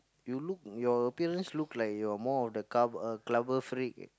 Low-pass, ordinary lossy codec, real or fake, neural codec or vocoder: none; none; real; none